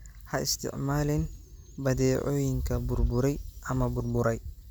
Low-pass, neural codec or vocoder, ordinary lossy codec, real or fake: none; none; none; real